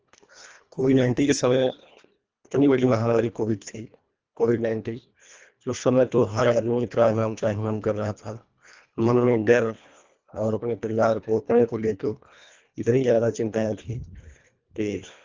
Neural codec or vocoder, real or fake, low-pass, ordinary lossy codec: codec, 24 kHz, 1.5 kbps, HILCodec; fake; 7.2 kHz; Opus, 24 kbps